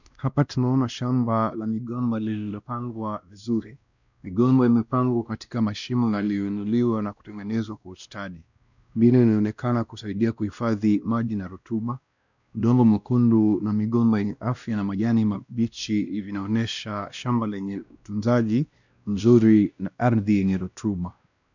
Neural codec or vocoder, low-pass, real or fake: codec, 16 kHz, 1 kbps, X-Codec, WavLM features, trained on Multilingual LibriSpeech; 7.2 kHz; fake